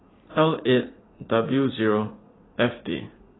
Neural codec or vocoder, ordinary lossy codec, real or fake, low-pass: vocoder, 44.1 kHz, 80 mel bands, Vocos; AAC, 16 kbps; fake; 7.2 kHz